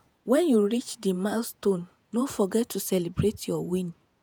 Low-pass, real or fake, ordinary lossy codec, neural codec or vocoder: none; fake; none; vocoder, 48 kHz, 128 mel bands, Vocos